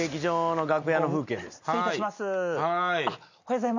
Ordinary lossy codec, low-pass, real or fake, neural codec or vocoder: none; 7.2 kHz; real; none